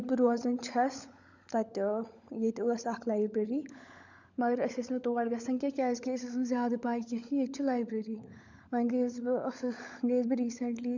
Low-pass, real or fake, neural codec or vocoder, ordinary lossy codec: 7.2 kHz; fake; codec, 16 kHz, 16 kbps, FunCodec, trained on LibriTTS, 50 frames a second; none